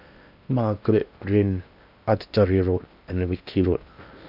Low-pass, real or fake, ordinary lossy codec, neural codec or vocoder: 5.4 kHz; fake; none; codec, 16 kHz in and 24 kHz out, 0.8 kbps, FocalCodec, streaming, 65536 codes